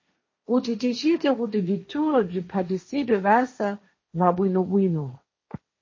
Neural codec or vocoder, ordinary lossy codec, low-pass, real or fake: codec, 16 kHz, 1.1 kbps, Voila-Tokenizer; MP3, 32 kbps; 7.2 kHz; fake